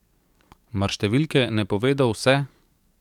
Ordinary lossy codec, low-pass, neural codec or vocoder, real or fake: none; 19.8 kHz; codec, 44.1 kHz, 7.8 kbps, DAC; fake